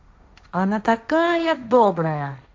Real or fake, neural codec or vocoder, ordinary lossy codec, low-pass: fake; codec, 16 kHz, 1.1 kbps, Voila-Tokenizer; none; none